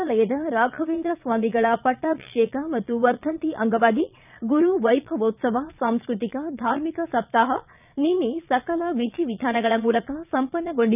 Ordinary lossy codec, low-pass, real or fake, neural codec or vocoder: none; 3.6 kHz; fake; vocoder, 22.05 kHz, 80 mel bands, Vocos